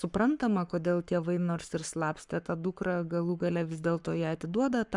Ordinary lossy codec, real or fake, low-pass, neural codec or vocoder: MP3, 96 kbps; fake; 10.8 kHz; codec, 44.1 kHz, 7.8 kbps, Pupu-Codec